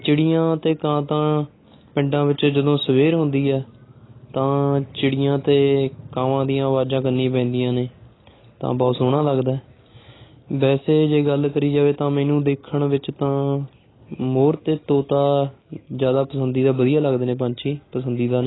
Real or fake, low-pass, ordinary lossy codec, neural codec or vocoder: real; 7.2 kHz; AAC, 16 kbps; none